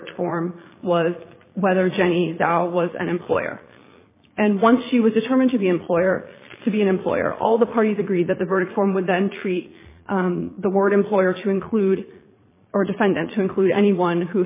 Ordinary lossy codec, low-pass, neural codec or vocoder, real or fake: MP3, 16 kbps; 3.6 kHz; vocoder, 22.05 kHz, 80 mel bands, Vocos; fake